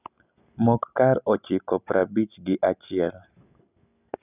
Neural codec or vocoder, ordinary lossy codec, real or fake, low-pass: none; none; real; 3.6 kHz